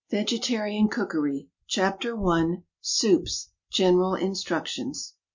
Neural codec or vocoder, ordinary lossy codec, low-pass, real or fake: none; MP3, 48 kbps; 7.2 kHz; real